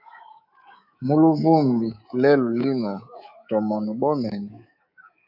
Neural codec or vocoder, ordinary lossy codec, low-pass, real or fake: codec, 24 kHz, 3.1 kbps, DualCodec; AAC, 48 kbps; 5.4 kHz; fake